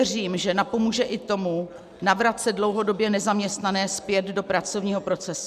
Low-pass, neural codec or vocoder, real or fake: 14.4 kHz; none; real